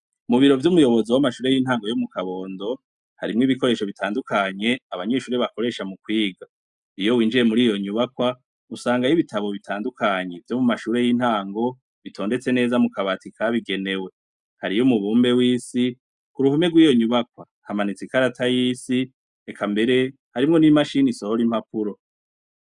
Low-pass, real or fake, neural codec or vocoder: 10.8 kHz; real; none